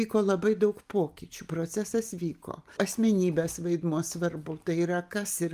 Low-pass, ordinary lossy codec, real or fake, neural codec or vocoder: 14.4 kHz; Opus, 32 kbps; real; none